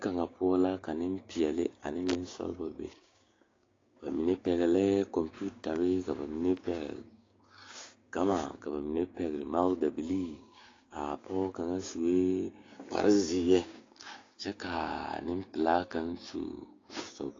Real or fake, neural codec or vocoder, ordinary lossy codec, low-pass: fake; codec, 16 kHz, 6 kbps, DAC; AAC, 32 kbps; 7.2 kHz